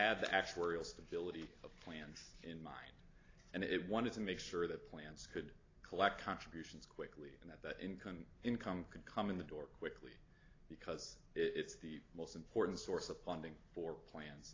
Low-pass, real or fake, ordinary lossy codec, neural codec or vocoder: 7.2 kHz; real; AAC, 32 kbps; none